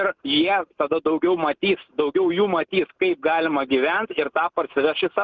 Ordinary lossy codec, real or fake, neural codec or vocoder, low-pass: Opus, 32 kbps; real; none; 7.2 kHz